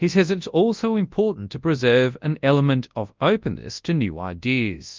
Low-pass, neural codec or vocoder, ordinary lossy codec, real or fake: 7.2 kHz; codec, 24 kHz, 0.9 kbps, WavTokenizer, large speech release; Opus, 32 kbps; fake